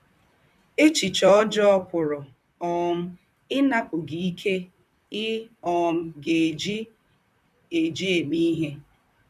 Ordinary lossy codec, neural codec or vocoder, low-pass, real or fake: none; vocoder, 44.1 kHz, 128 mel bands, Pupu-Vocoder; 14.4 kHz; fake